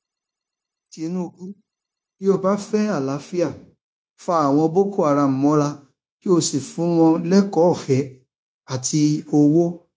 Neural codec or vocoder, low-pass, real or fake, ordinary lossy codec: codec, 16 kHz, 0.9 kbps, LongCat-Audio-Codec; none; fake; none